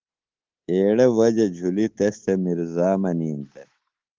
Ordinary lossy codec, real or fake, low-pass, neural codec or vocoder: Opus, 16 kbps; fake; 7.2 kHz; codec, 24 kHz, 3.1 kbps, DualCodec